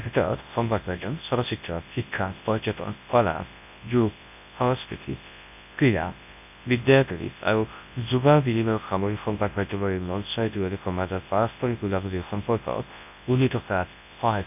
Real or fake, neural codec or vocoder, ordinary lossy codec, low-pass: fake; codec, 24 kHz, 0.9 kbps, WavTokenizer, large speech release; none; 3.6 kHz